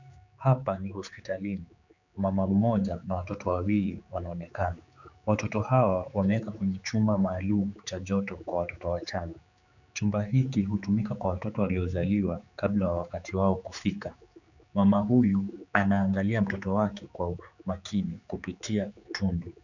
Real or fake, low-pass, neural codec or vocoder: fake; 7.2 kHz; codec, 16 kHz, 4 kbps, X-Codec, HuBERT features, trained on general audio